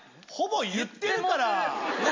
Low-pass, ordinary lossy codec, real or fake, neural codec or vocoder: 7.2 kHz; MP3, 48 kbps; real; none